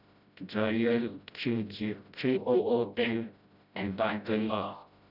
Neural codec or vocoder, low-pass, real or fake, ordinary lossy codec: codec, 16 kHz, 0.5 kbps, FreqCodec, smaller model; 5.4 kHz; fake; none